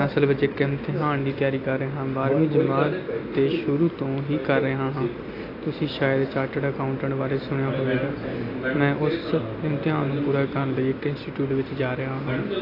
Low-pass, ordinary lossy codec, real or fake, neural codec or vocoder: 5.4 kHz; AAC, 32 kbps; real; none